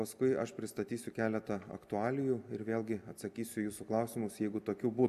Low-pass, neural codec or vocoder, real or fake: 14.4 kHz; none; real